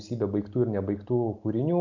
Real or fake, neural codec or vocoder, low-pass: real; none; 7.2 kHz